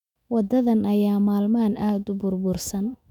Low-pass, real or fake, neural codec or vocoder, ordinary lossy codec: 19.8 kHz; fake; autoencoder, 48 kHz, 128 numbers a frame, DAC-VAE, trained on Japanese speech; none